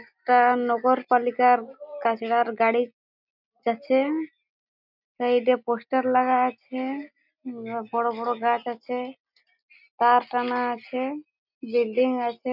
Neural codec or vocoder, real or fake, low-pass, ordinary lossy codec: none; real; 5.4 kHz; none